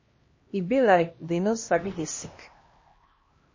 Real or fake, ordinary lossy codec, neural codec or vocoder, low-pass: fake; MP3, 32 kbps; codec, 16 kHz, 1 kbps, X-Codec, HuBERT features, trained on LibriSpeech; 7.2 kHz